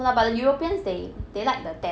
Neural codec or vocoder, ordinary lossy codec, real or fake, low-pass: none; none; real; none